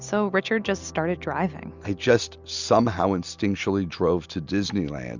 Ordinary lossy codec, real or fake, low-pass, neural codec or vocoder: Opus, 64 kbps; real; 7.2 kHz; none